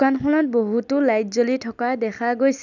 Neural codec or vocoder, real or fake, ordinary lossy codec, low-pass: none; real; none; 7.2 kHz